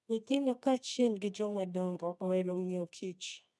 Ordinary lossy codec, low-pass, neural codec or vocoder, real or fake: none; none; codec, 24 kHz, 0.9 kbps, WavTokenizer, medium music audio release; fake